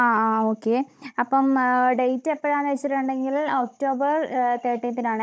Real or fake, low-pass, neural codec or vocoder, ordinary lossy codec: fake; none; codec, 16 kHz, 16 kbps, FunCodec, trained on Chinese and English, 50 frames a second; none